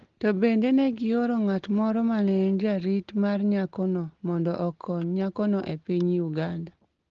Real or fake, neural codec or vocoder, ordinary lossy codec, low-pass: real; none; Opus, 16 kbps; 7.2 kHz